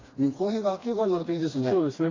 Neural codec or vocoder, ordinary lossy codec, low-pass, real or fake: codec, 16 kHz, 2 kbps, FreqCodec, smaller model; AAC, 32 kbps; 7.2 kHz; fake